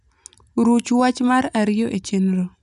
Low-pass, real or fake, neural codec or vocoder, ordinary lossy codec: 10.8 kHz; real; none; none